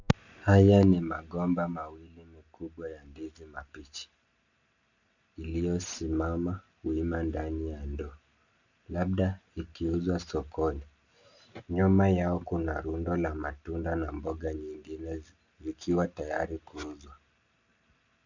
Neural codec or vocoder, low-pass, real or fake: none; 7.2 kHz; real